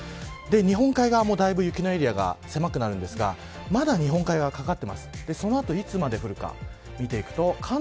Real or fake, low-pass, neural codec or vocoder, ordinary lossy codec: real; none; none; none